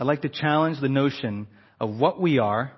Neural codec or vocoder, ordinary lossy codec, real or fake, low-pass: none; MP3, 24 kbps; real; 7.2 kHz